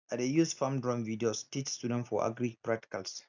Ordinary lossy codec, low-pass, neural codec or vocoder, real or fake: none; 7.2 kHz; none; real